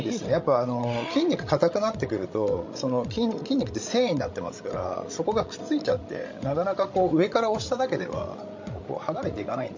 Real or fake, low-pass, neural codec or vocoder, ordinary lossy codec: fake; 7.2 kHz; codec, 16 kHz, 16 kbps, FreqCodec, larger model; MP3, 48 kbps